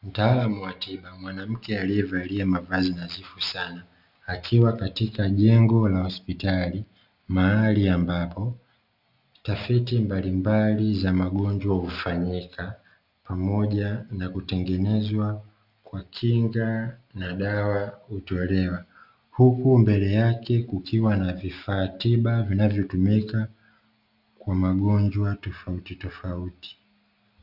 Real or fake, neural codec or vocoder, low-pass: real; none; 5.4 kHz